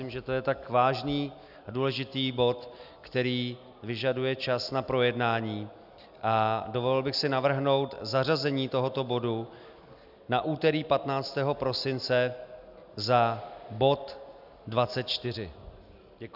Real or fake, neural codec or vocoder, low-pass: real; none; 5.4 kHz